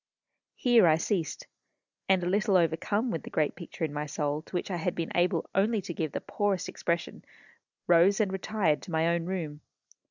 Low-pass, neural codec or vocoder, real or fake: 7.2 kHz; none; real